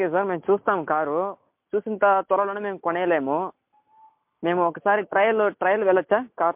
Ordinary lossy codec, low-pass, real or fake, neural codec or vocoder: MP3, 32 kbps; 3.6 kHz; real; none